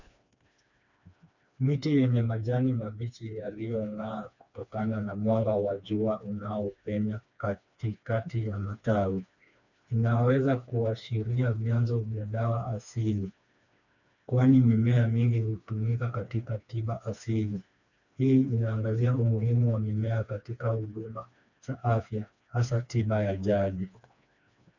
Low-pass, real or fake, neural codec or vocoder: 7.2 kHz; fake; codec, 16 kHz, 2 kbps, FreqCodec, smaller model